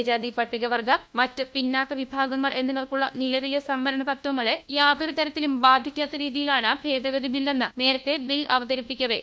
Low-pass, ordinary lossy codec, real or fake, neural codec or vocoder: none; none; fake; codec, 16 kHz, 1 kbps, FunCodec, trained on LibriTTS, 50 frames a second